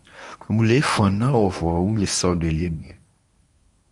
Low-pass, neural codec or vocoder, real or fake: 10.8 kHz; codec, 24 kHz, 0.9 kbps, WavTokenizer, medium speech release version 1; fake